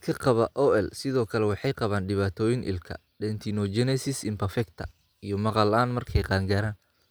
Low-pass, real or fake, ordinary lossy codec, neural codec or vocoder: none; real; none; none